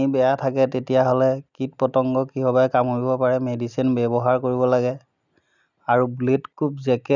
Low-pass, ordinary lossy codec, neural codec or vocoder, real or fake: 7.2 kHz; none; none; real